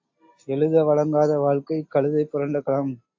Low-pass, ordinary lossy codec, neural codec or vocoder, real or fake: 7.2 kHz; AAC, 48 kbps; none; real